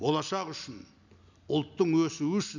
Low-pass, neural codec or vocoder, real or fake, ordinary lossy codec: 7.2 kHz; none; real; none